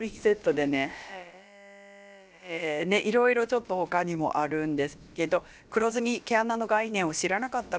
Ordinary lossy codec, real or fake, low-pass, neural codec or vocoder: none; fake; none; codec, 16 kHz, about 1 kbps, DyCAST, with the encoder's durations